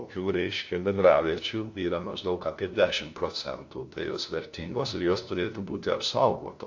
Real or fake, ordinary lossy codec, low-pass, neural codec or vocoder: fake; AAC, 48 kbps; 7.2 kHz; codec, 16 kHz, 1 kbps, FunCodec, trained on LibriTTS, 50 frames a second